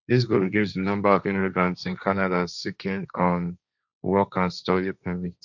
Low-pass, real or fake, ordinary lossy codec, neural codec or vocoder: 7.2 kHz; fake; none; codec, 16 kHz, 1.1 kbps, Voila-Tokenizer